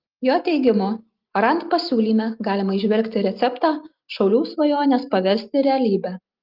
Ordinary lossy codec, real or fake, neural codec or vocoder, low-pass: Opus, 32 kbps; real; none; 5.4 kHz